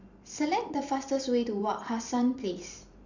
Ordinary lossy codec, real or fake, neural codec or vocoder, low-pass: none; fake; vocoder, 44.1 kHz, 128 mel bands every 512 samples, BigVGAN v2; 7.2 kHz